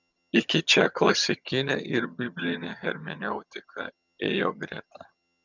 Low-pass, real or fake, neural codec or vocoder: 7.2 kHz; fake; vocoder, 22.05 kHz, 80 mel bands, HiFi-GAN